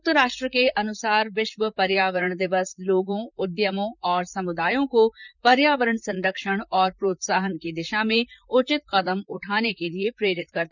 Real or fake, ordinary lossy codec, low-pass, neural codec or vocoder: fake; none; 7.2 kHz; vocoder, 44.1 kHz, 128 mel bands, Pupu-Vocoder